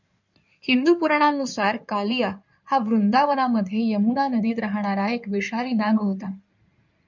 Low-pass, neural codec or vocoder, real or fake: 7.2 kHz; codec, 16 kHz in and 24 kHz out, 2.2 kbps, FireRedTTS-2 codec; fake